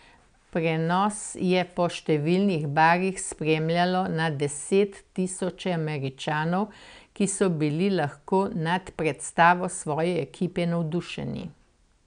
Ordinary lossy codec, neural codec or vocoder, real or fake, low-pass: none; none; real; 9.9 kHz